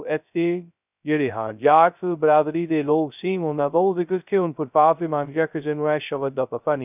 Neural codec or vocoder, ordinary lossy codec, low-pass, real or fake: codec, 16 kHz, 0.2 kbps, FocalCodec; none; 3.6 kHz; fake